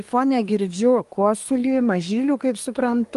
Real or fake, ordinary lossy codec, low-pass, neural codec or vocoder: fake; Opus, 32 kbps; 10.8 kHz; codec, 24 kHz, 1 kbps, SNAC